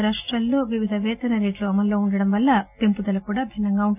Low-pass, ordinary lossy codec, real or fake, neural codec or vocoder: 3.6 kHz; none; real; none